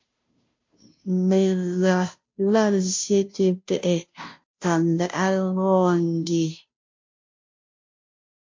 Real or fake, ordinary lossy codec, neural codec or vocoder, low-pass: fake; MP3, 48 kbps; codec, 16 kHz, 0.5 kbps, FunCodec, trained on Chinese and English, 25 frames a second; 7.2 kHz